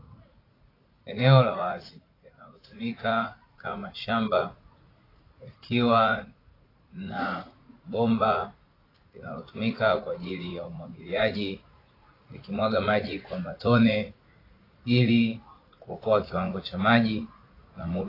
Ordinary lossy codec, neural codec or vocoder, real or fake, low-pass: AAC, 24 kbps; vocoder, 44.1 kHz, 80 mel bands, Vocos; fake; 5.4 kHz